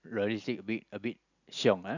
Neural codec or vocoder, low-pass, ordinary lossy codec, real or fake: none; 7.2 kHz; none; real